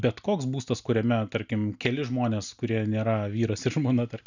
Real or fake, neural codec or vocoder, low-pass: real; none; 7.2 kHz